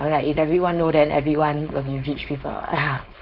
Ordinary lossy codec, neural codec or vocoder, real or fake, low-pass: none; codec, 16 kHz, 4.8 kbps, FACodec; fake; 5.4 kHz